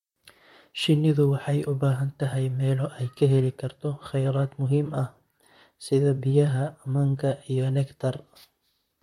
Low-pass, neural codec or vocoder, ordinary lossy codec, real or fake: 19.8 kHz; vocoder, 44.1 kHz, 128 mel bands, Pupu-Vocoder; MP3, 64 kbps; fake